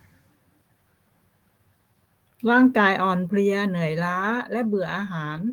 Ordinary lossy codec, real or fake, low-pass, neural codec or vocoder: Opus, 24 kbps; fake; 19.8 kHz; autoencoder, 48 kHz, 128 numbers a frame, DAC-VAE, trained on Japanese speech